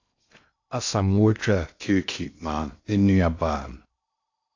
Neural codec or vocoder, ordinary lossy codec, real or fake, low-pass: codec, 16 kHz in and 24 kHz out, 0.6 kbps, FocalCodec, streaming, 2048 codes; AAC, 48 kbps; fake; 7.2 kHz